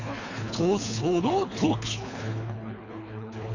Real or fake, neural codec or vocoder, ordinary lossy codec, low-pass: fake; codec, 24 kHz, 3 kbps, HILCodec; none; 7.2 kHz